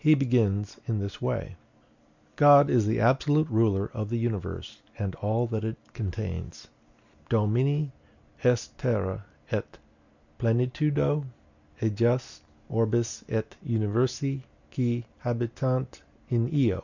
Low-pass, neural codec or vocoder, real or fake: 7.2 kHz; none; real